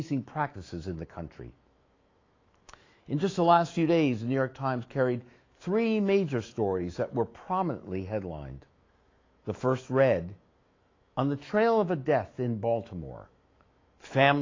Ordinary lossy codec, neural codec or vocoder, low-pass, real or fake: AAC, 32 kbps; autoencoder, 48 kHz, 128 numbers a frame, DAC-VAE, trained on Japanese speech; 7.2 kHz; fake